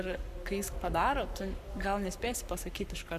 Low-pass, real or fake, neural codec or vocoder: 14.4 kHz; fake; codec, 44.1 kHz, 7.8 kbps, Pupu-Codec